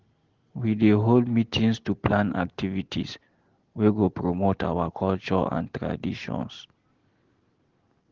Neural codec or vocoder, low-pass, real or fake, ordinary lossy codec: none; 7.2 kHz; real; Opus, 16 kbps